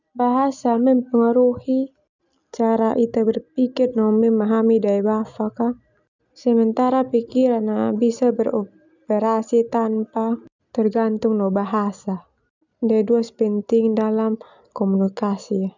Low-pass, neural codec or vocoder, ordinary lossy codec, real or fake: 7.2 kHz; none; none; real